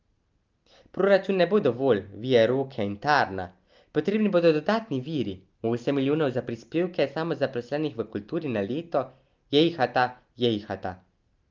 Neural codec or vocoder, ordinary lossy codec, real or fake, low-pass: none; Opus, 32 kbps; real; 7.2 kHz